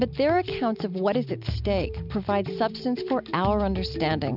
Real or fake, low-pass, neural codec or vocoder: real; 5.4 kHz; none